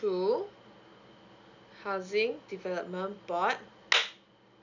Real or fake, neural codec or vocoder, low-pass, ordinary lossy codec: real; none; 7.2 kHz; none